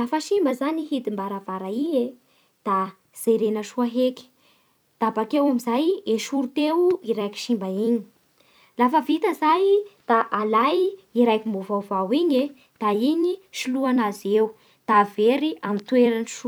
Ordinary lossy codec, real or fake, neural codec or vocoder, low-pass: none; fake; vocoder, 44.1 kHz, 128 mel bands every 256 samples, BigVGAN v2; none